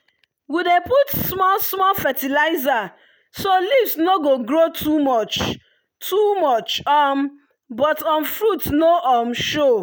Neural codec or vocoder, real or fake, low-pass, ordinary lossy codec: none; real; none; none